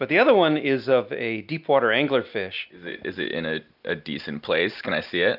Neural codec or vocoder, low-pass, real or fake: none; 5.4 kHz; real